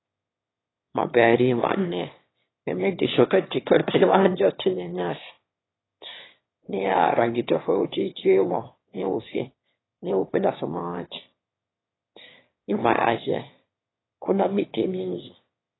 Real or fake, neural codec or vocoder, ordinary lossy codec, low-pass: fake; autoencoder, 22.05 kHz, a latent of 192 numbers a frame, VITS, trained on one speaker; AAC, 16 kbps; 7.2 kHz